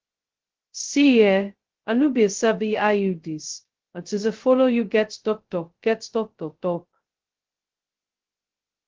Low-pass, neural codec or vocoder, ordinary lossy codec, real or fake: 7.2 kHz; codec, 16 kHz, 0.2 kbps, FocalCodec; Opus, 16 kbps; fake